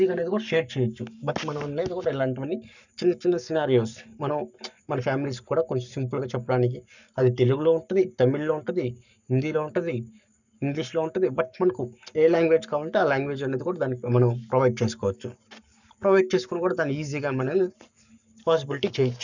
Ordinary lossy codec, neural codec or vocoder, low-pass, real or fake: none; codec, 44.1 kHz, 7.8 kbps, Pupu-Codec; 7.2 kHz; fake